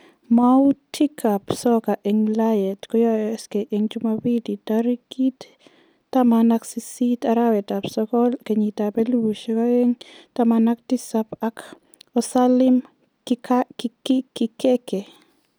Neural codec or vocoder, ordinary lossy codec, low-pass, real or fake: none; none; none; real